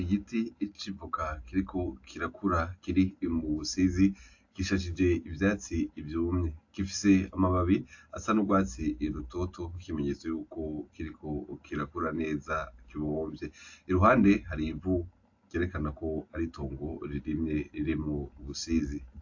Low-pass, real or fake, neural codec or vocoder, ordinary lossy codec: 7.2 kHz; real; none; AAC, 48 kbps